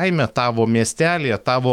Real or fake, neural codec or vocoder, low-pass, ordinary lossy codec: fake; autoencoder, 48 kHz, 128 numbers a frame, DAC-VAE, trained on Japanese speech; 19.8 kHz; MP3, 96 kbps